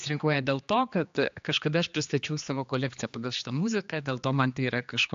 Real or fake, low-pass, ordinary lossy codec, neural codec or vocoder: fake; 7.2 kHz; AAC, 64 kbps; codec, 16 kHz, 2 kbps, X-Codec, HuBERT features, trained on general audio